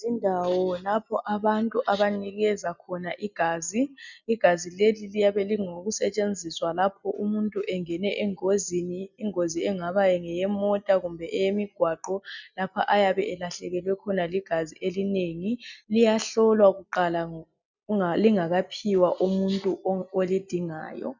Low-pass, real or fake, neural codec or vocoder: 7.2 kHz; real; none